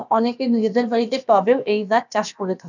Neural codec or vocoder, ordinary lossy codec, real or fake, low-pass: codec, 16 kHz, about 1 kbps, DyCAST, with the encoder's durations; none; fake; 7.2 kHz